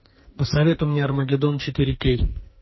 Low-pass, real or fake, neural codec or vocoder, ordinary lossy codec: 7.2 kHz; fake; codec, 44.1 kHz, 2.6 kbps, SNAC; MP3, 24 kbps